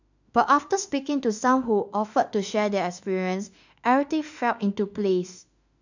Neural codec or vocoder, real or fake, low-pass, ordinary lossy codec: autoencoder, 48 kHz, 32 numbers a frame, DAC-VAE, trained on Japanese speech; fake; 7.2 kHz; none